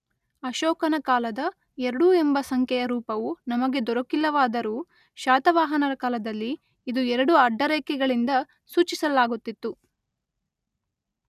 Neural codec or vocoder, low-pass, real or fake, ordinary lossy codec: none; 14.4 kHz; real; none